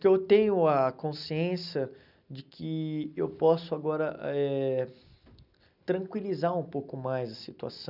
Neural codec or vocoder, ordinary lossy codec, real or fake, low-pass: none; none; real; 5.4 kHz